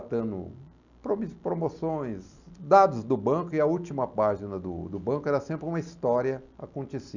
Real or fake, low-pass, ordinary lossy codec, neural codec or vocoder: real; 7.2 kHz; none; none